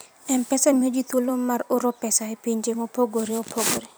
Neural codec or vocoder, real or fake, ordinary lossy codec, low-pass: vocoder, 44.1 kHz, 128 mel bands every 256 samples, BigVGAN v2; fake; none; none